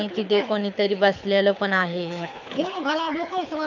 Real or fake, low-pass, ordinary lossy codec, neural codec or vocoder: fake; 7.2 kHz; none; codec, 24 kHz, 6 kbps, HILCodec